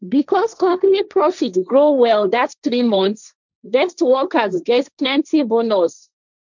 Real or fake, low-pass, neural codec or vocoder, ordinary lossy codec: fake; 7.2 kHz; codec, 16 kHz, 1.1 kbps, Voila-Tokenizer; none